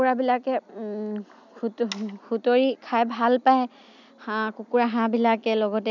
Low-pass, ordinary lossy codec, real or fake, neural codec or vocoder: 7.2 kHz; none; real; none